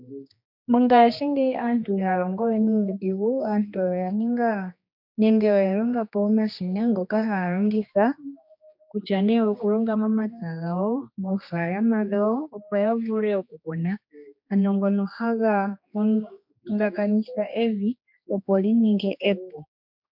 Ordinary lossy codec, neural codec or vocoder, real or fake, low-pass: MP3, 48 kbps; codec, 16 kHz, 2 kbps, X-Codec, HuBERT features, trained on general audio; fake; 5.4 kHz